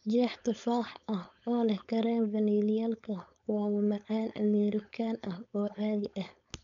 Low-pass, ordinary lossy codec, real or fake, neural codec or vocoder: 7.2 kHz; none; fake; codec, 16 kHz, 4.8 kbps, FACodec